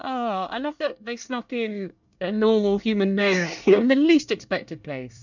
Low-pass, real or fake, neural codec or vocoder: 7.2 kHz; fake; codec, 24 kHz, 1 kbps, SNAC